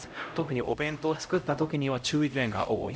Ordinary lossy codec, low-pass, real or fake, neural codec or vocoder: none; none; fake; codec, 16 kHz, 0.5 kbps, X-Codec, HuBERT features, trained on LibriSpeech